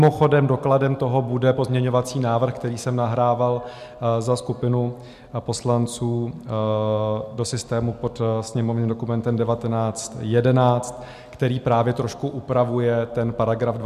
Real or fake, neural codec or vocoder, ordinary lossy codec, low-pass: real; none; MP3, 96 kbps; 14.4 kHz